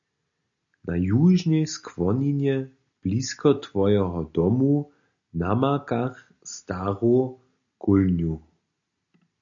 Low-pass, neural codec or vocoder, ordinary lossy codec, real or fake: 7.2 kHz; none; AAC, 64 kbps; real